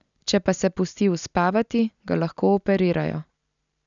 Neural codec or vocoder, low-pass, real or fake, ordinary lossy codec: none; 7.2 kHz; real; none